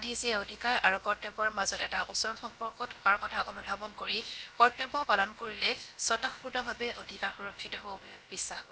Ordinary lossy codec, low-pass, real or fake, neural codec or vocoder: none; none; fake; codec, 16 kHz, about 1 kbps, DyCAST, with the encoder's durations